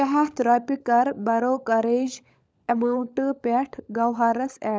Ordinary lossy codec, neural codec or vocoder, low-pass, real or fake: none; codec, 16 kHz, 16 kbps, FunCodec, trained on LibriTTS, 50 frames a second; none; fake